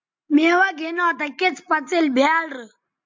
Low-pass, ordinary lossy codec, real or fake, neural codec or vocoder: 7.2 kHz; MP3, 64 kbps; real; none